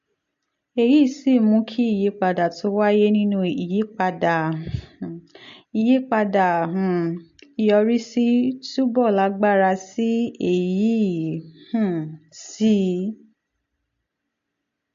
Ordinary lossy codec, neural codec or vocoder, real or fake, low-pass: MP3, 48 kbps; none; real; 7.2 kHz